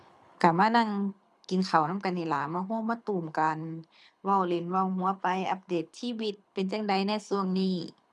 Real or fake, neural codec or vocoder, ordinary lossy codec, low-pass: fake; codec, 24 kHz, 6 kbps, HILCodec; none; none